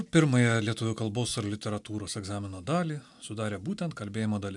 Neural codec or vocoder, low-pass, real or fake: none; 10.8 kHz; real